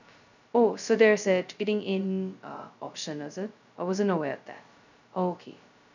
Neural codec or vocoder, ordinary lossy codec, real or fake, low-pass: codec, 16 kHz, 0.2 kbps, FocalCodec; none; fake; 7.2 kHz